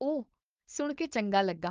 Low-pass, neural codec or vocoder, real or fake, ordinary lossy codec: 7.2 kHz; codec, 16 kHz, 4.8 kbps, FACodec; fake; Opus, 32 kbps